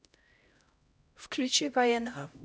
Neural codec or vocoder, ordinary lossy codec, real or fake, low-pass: codec, 16 kHz, 0.5 kbps, X-Codec, HuBERT features, trained on LibriSpeech; none; fake; none